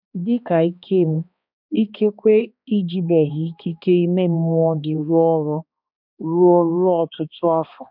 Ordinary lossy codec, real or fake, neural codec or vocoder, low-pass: none; fake; codec, 16 kHz, 2 kbps, X-Codec, HuBERT features, trained on balanced general audio; 5.4 kHz